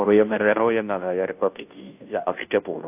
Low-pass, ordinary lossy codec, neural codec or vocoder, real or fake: 3.6 kHz; AAC, 32 kbps; codec, 16 kHz, 0.5 kbps, FunCodec, trained on Chinese and English, 25 frames a second; fake